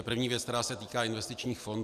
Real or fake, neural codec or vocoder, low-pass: real; none; 14.4 kHz